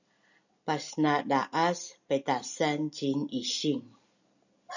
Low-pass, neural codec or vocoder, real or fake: 7.2 kHz; none; real